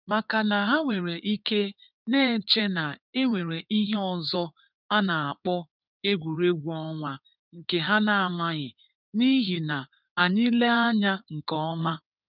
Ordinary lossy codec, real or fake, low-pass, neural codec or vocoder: none; fake; 5.4 kHz; codec, 16 kHz in and 24 kHz out, 2.2 kbps, FireRedTTS-2 codec